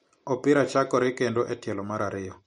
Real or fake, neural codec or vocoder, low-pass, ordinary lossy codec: real; none; 19.8 kHz; MP3, 48 kbps